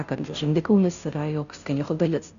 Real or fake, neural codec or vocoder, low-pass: fake; codec, 16 kHz, 0.5 kbps, FunCodec, trained on Chinese and English, 25 frames a second; 7.2 kHz